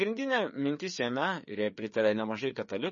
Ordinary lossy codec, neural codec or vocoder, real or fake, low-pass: MP3, 32 kbps; codec, 16 kHz, 4 kbps, FreqCodec, larger model; fake; 7.2 kHz